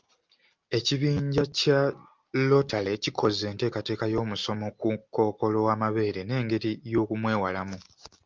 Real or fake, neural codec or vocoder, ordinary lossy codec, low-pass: real; none; Opus, 24 kbps; 7.2 kHz